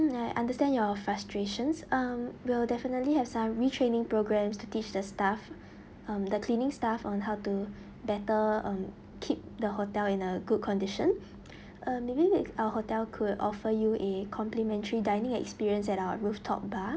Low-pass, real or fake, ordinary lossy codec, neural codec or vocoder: none; real; none; none